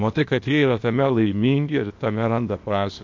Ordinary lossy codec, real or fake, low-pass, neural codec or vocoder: MP3, 48 kbps; fake; 7.2 kHz; codec, 16 kHz, 0.8 kbps, ZipCodec